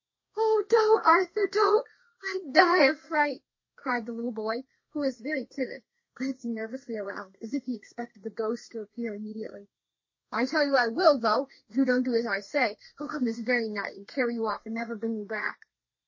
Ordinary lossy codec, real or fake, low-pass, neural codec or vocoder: MP3, 32 kbps; fake; 7.2 kHz; codec, 32 kHz, 1.9 kbps, SNAC